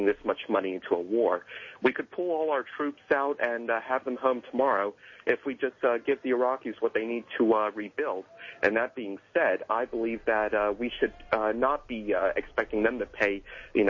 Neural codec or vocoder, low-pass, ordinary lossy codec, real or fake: none; 7.2 kHz; MP3, 32 kbps; real